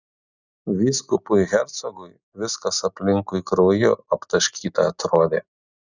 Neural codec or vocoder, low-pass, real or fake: none; 7.2 kHz; real